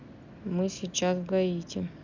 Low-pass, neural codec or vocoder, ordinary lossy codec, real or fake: 7.2 kHz; none; none; real